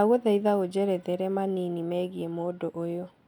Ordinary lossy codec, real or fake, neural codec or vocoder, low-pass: none; real; none; 19.8 kHz